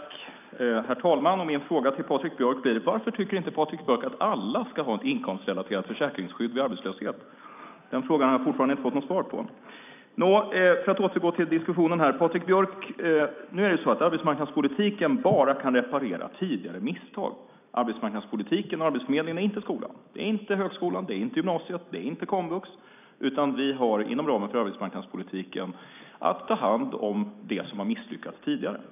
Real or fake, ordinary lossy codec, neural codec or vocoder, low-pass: real; none; none; 3.6 kHz